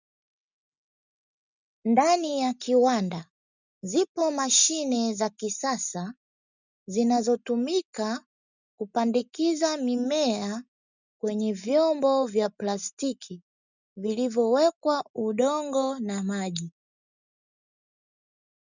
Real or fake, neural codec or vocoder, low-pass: real; none; 7.2 kHz